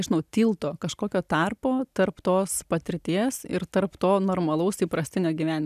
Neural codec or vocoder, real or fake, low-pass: none; real; 14.4 kHz